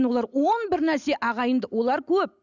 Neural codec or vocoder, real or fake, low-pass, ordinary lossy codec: none; real; 7.2 kHz; none